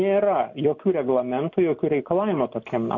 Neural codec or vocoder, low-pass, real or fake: none; 7.2 kHz; real